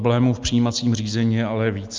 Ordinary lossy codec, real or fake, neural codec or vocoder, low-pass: Opus, 24 kbps; real; none; 7.2 kHz